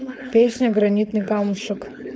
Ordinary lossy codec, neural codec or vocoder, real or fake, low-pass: none; codec, 16 kHz, 4.8 kbps, FACodec; fake; none